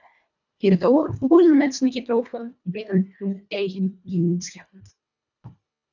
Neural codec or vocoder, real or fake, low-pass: codec, 24 kHz, 1.5 kbps, HILCodec; fake; 7.2 kHz